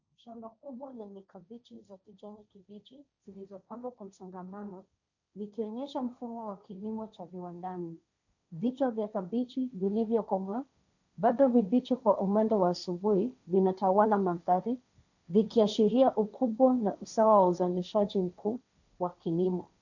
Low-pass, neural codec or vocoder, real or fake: 7.2 kHz; codec, 16 kHz, 1.1 kbps, Voila-Tokenizer; fake